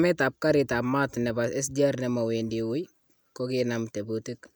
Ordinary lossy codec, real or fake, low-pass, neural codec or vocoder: none; real; none; none